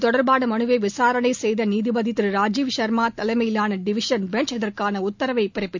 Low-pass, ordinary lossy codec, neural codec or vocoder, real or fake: 7.2 kHz; MP3, 64 kbps; none; real